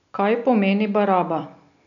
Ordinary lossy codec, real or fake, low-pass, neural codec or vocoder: none; real; 7.2 kHz; none